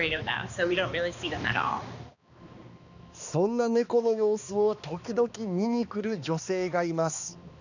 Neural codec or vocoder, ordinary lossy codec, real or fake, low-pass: codec, 16 kHz, 2 kbps, X-Codec, HuBERT features, trained on balanced general audio; none; fake; 7.2 kHz